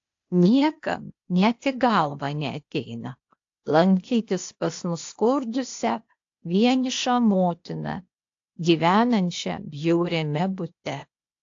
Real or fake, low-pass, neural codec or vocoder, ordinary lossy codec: fake; 7.2 kHz; codec, 16 kHz, 0.8 kbps, ZipCodec; AAC, 48 kbps